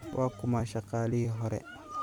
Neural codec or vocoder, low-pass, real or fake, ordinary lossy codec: vocoder, 44.1 kHz, 128 mel bands every 256 samples, BigVGAN v2; 19.8 kHz; fake; none